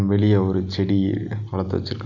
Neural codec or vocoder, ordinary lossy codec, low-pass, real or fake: none; none; 7.2 kHz; real